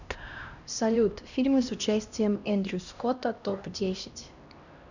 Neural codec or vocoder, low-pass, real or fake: codec, 16 kHz, 1 kbps, X-Codec, HuBERT features, trained on LibriSpeech; 7.2 kHz; fake